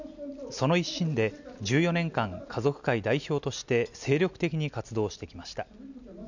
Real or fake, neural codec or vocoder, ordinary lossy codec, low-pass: real; none; none; 7.2 kHz